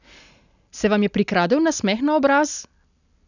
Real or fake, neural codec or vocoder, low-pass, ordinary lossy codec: real; none; 7.2 kHz; none